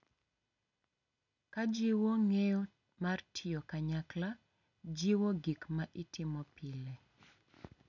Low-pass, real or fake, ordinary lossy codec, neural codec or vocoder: 7.2 kHz; real; none; none